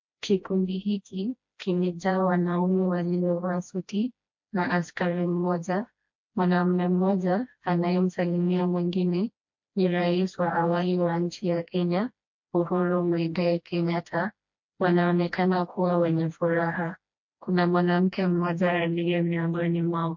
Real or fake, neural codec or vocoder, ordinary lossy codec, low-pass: fake; codec, 16 kHz, 1 kbps, FreqCodec, smaller model; MP3, 48 kbps; 7.2 kHz